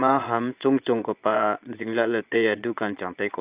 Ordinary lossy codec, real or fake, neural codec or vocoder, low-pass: Opus, 24 kbps; fake; vocoder, 22.05 kHz, 80 mel bands, Vocos; 3.6 kHz